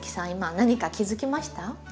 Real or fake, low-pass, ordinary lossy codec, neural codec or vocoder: real; none; none; none